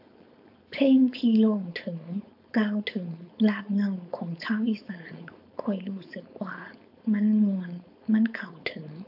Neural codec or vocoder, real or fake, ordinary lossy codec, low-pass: codec, 16 kHz, 4.8 kbps, FACodec; fake; AAC, 48 kbps; 5.4 kHz